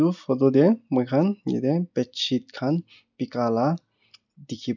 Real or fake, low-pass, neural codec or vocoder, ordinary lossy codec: real; 7.2 kHz; none; none